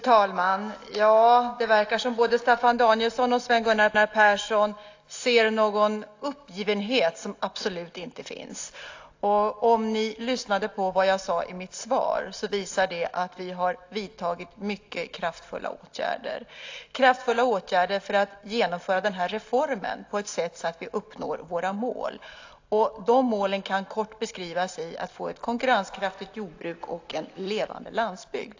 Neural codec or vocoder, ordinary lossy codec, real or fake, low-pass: none; AAC, 48 kbps; real; 7.2 kHz